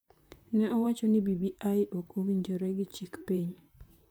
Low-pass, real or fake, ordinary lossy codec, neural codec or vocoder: none; fake; none; vocoder, 44.1 kHz, 128 mel bands, Pupu-Vocoder